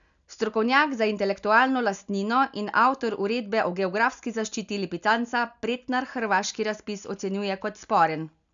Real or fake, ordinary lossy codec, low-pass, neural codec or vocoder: real; none; 7.2 kHz; none